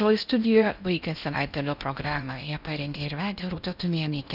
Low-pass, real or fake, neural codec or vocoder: 5.4 kHz; fake; codec, 16 kHz in and 24 kHz out, 0.6 kbps, FocalCodec, streaming, 4096 codes